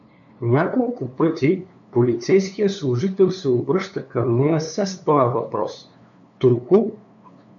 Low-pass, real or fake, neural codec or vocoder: 7.2 kHz; fake; codec, 16 kHz, 2 kbps, FunCodec, trained on LibriTTS, 25 frames a second